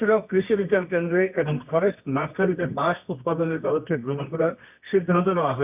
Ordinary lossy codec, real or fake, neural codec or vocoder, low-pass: none; fake; codec, 24 kHz, 0.9 kbps, WavTokenizer, medium music audio release; 3.6 kHz